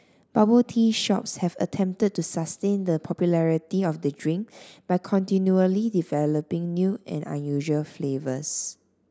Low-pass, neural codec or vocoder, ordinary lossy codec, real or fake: none; none; none; real